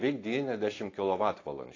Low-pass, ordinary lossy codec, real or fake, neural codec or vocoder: 7.2 kHz; AAC, 32 kbps; real; none